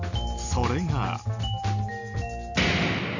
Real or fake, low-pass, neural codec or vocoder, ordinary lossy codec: real; 7.2 kHz; none; none